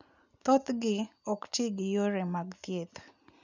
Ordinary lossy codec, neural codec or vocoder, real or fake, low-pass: none; none; real; 7.2 kHz